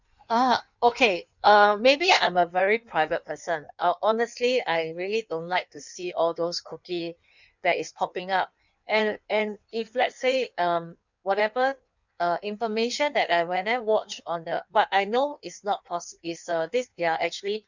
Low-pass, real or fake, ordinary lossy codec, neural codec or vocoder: 7.2 kHz; fake; none; codec, 16 kHz in and 24 kHz out, 1.1 kbps, FireRedTTS-2 codec